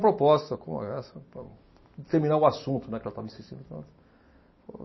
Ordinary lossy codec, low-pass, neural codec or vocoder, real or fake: MP3, 24 kbps; 7.2 kHz; none; real